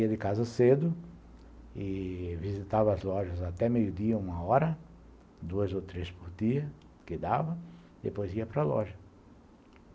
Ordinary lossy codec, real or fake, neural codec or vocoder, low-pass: none; real; none; none